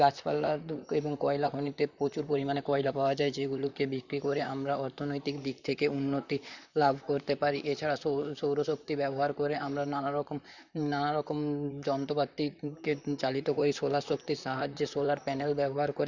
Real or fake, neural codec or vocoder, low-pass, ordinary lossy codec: fake; vocoder, 44.1 kHz, 128 mel bands, Pupu-Vocoder; 7.2 kHz; none